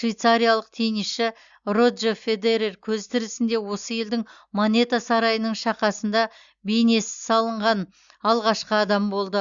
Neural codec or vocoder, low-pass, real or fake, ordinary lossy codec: none; 7.2 kHz; real; Opus, 64 kbps